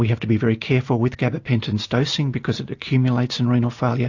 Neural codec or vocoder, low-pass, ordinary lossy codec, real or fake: none; 7.2 kHz; AAC, 48 kbps; real